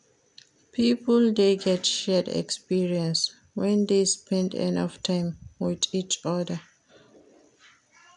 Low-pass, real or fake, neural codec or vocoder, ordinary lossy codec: 10.8 kHz; real; none; none